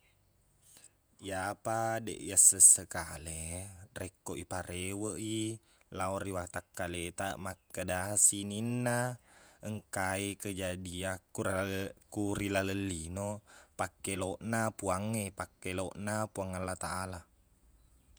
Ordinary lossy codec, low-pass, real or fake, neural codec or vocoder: none; none; fake; vocoder, 48 kHz, 128 mel bands, Vocos